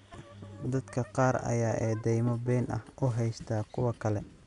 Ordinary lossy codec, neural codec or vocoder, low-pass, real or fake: none; none; 10.8 kHz; real